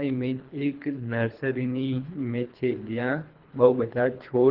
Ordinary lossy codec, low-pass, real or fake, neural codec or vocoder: Opus, 16 kbps; 5.4 kHz; fake; codec, 24 kHz, 3 kbps, HILCodec